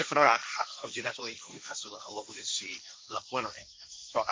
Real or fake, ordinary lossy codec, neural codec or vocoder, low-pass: fake; none; codec, 16 kHz, 1.1 kbps, Voila-Tokenizer; none